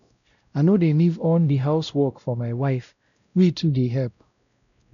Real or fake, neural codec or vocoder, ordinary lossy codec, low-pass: fake; codec, 16 kHz, 0.5 kbps, X-Codec, WavLM features, trained on Multilingual LibriSpeech; none; 7.2 kHz